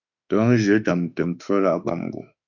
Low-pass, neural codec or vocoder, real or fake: 7.2 kHz; autoencoder, 48 kHz, 32 numbers a frame, DAC-VAE, trained on Japanese speech; fake